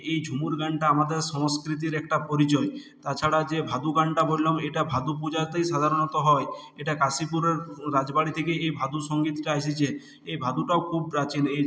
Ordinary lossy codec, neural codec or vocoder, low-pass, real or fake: none; none; none; real